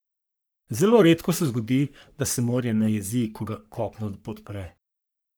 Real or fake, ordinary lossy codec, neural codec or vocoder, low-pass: fake; none; codec, 44.1 kHz, 3.4 kbps, Pupu-Codec; none